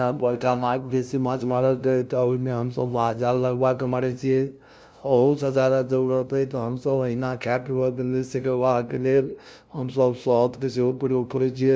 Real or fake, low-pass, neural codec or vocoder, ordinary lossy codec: fake; none; codec, 16 kHz, 0.5 kbps, FunCodec, trained on LibriTTS, 25 frames a second; none